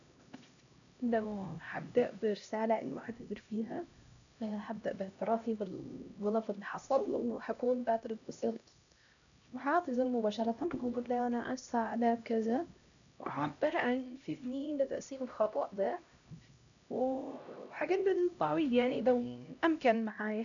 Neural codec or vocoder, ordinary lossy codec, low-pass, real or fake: codec, 16 kHz, 1 kbps, X-Codec, HuBERT features, trained on LibriSpeech; none; 7.2 kHz; fake